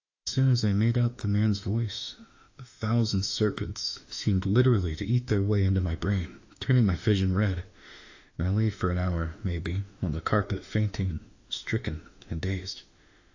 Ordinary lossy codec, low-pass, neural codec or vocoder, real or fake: AAC, 48 kbps; 7.2 kHz; autoencoder, 48 kHz, 32 numbers a frame, DAC-VAE, trained on Japanese speech; fake